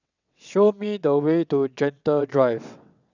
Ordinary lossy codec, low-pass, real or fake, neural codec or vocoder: none; 7.2 kHz; fake; vocoder, 22.05 kHz, 80 mel bands, WaveNeXt